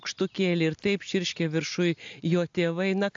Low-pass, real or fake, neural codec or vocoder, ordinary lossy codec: 7.2 kHz; real; none; AAC, 64 kbps